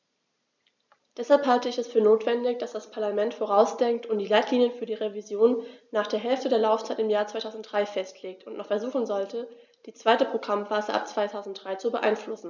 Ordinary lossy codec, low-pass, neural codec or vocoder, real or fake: none; 7.2 kHz; none; real